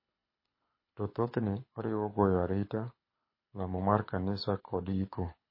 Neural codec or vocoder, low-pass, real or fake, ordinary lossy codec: codec, 24 kHz, 6 kbps, HILCodec; 5.4 kHz; fake; MP3, 24 kbps